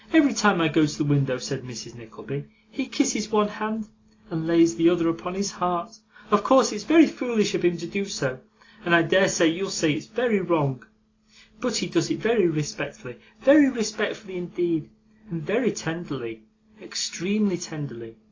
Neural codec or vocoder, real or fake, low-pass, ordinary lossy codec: none; real; 7.2 kHz; AAC, 32 kbps